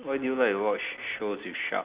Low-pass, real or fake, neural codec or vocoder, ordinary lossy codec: 3.6 kHz; real; none; Opus, 64 kbps